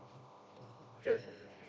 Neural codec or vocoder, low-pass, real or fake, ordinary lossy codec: codec, 16 kHz, 1 kbps, FreqCodec, smaller model; none; fake; none